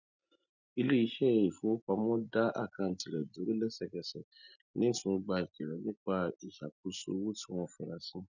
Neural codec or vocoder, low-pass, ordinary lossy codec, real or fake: none; 7.2 kHz; none; real